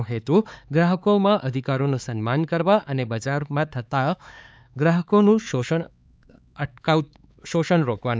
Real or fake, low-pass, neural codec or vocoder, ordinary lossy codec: fake; none; codec, 16 kHz, 4 kbps, X-Codec, HuBERT features, trained on LibriSpeech; none